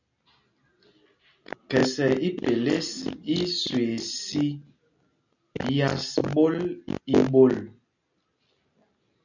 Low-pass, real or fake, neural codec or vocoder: 7.2 kHz; real; none